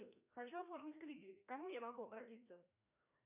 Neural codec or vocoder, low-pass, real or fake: codec, 16 kHz, 1 kbps, FreqCodec, larger model; 3.6 kHz; fake